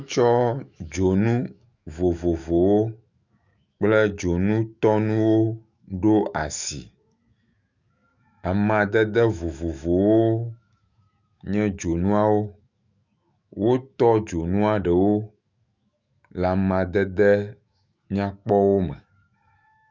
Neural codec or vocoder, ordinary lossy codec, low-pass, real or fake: autoencoder, 48 kHz, 128 numbers a frame, DAC-VAE, trained on Japanese speech; Opus, 64 kbps; 7.2 kHz; fake